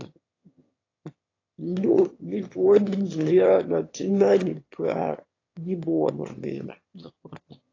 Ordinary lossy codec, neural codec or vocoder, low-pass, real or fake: AAC, 32 kbps; autoencoder, 22.05 kHz, a latent of 192 numbers a frame, VITS, trained on one speaker; 7.2 kHz; fake